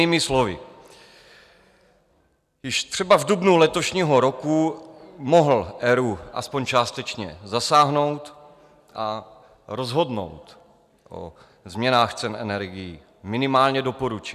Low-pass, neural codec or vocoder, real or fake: 14.4 kHz; none; real